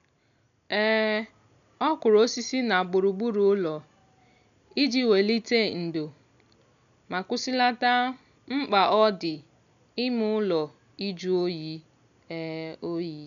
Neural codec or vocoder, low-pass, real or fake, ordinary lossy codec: none; 7.2 kHz; real; none